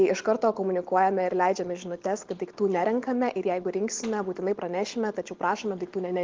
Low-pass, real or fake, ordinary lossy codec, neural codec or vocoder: 7.2 kHz; real; Opus, 16 kbps; none